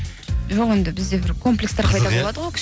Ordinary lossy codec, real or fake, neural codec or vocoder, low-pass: none; real; none; none